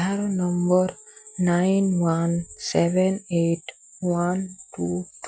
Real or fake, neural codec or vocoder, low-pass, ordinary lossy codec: real; none; none; none